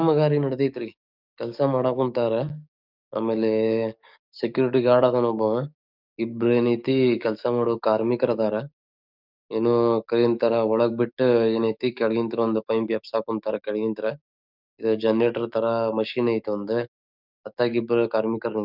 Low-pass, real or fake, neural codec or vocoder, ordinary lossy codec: 5.4 kHz; fake; codec, 44.1 kHz, 7.8 kbps, DAC; none